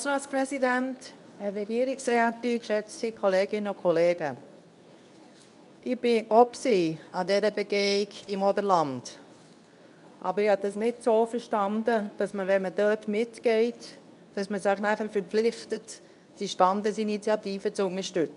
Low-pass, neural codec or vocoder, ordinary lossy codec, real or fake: 10.8 kHz; codec, 24 kHz, 0.9 kbps, WavTokenizer, medium speech release version 2; none; fake